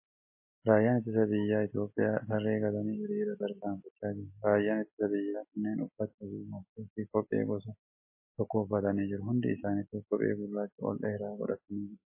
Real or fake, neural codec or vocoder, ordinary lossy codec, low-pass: real; none; MP3, 24 kbps; 3.6 kHz